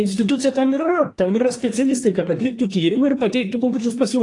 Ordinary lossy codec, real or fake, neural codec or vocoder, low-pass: AAC, 48 kbps; fake; codec, 24 kHz, 1 kbps, SNAC; 10.8 kHz